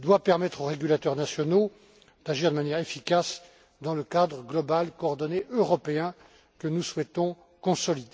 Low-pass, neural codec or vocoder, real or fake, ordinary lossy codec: none; none; real; none